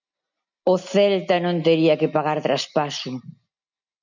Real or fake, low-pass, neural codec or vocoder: real; 7.2 kHz; none